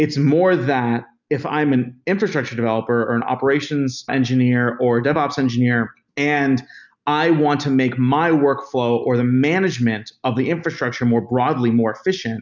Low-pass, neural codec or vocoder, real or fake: 7.2 kHz; none; real